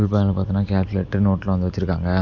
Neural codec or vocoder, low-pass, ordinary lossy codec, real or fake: none; 7.2 kHz; none; real